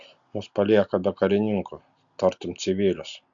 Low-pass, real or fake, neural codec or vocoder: 7.2 kHz; real; none